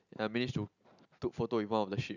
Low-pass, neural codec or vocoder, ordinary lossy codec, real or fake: 7.2 kHz; none; none; real